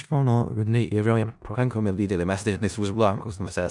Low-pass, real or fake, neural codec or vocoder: 10.8 kHz; fake; codec, 16 kHz in and 24 kHz out, 0.4 kbps, LongCat-Audio-Codec, four codebook decoder